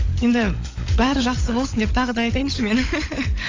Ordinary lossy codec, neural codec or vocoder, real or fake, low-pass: AAC, 32 kbps; codec, 16 kHz, 16 kbps, FunCodec, trained on LibriTTS, 50 frames a second; fake; 7.2 kHz